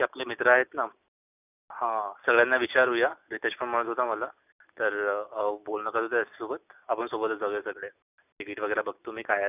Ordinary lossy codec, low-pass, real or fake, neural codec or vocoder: none; 3.6 kHz; real; none